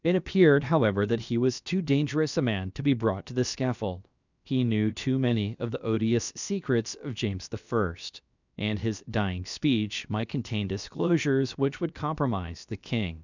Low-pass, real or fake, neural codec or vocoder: 7.2 kHz; fake; codec, 16 kHz, about 1 kbps, DyCAST, with the encoder's durations